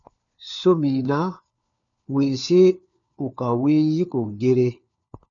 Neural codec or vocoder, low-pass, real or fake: codec, 16 kHz, 4 kbps, FunCodec, trained on LibriTTS, 50 frames a second; 7.2 kHz; fake